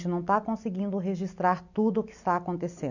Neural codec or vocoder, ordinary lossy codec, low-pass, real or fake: none; none; 7.2 kHz; real